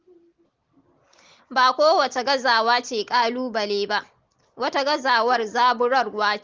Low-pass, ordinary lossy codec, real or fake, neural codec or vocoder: 7.2 kHz; Opus, 16 kbps; real; none